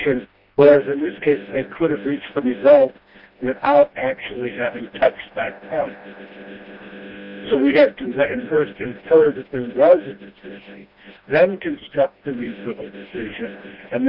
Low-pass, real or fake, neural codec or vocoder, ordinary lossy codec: 5.4 kHz; fake; codec, 16 kHz, 1 kbps, FreqCodec, smaller model; AAC, 48 kbps